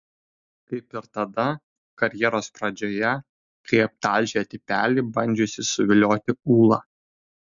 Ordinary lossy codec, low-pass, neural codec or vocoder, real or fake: MP3, 64 kbps; 7.2 kHz; none; real